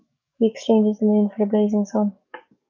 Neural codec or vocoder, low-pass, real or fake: codec, 44.1 kHz, 7.8 kbps, DAC; 7.2 kHz; fake